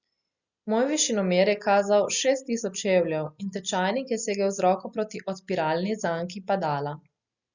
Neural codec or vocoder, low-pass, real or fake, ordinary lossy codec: none; 7.2 kHz; real; Opus, 64 kbps